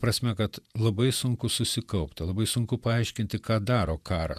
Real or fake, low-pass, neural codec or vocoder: fake; 14.4 kHz; vocoder, 48 kHz, 128 mel bands, Vocos